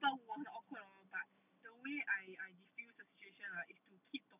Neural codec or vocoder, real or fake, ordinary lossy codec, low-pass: none; real; none; 3.6 kHz